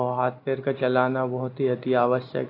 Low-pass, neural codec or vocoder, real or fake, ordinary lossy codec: 5.4 kHz; none; real; AAC, 32 kbps